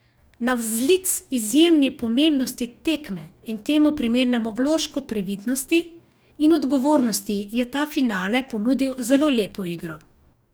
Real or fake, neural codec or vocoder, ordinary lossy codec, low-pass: fake; codec, 44.1 kHz, 2.6 kbps, DAC; none; none